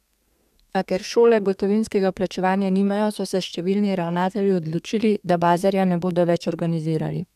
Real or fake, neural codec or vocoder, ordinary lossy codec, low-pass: fake; codec, 32 kHz, 1.9 kbps, SNAC; none; 14.4 kHz